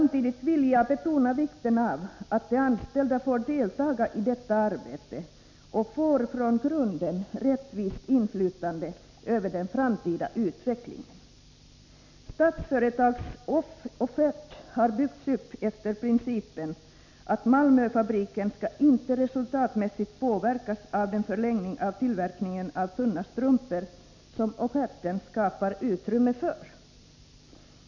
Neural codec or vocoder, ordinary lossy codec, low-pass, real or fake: none; MP3, 64 kbps; 7.2 kHz; real